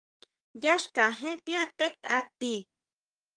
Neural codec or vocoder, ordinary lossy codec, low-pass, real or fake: codec, 24 kHz, 1 kbps, SNAC; Opus, 32 kbps; 9.9 kHz; fake